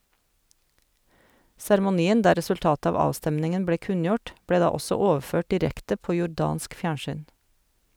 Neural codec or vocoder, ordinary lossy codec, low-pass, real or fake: none; none; none; real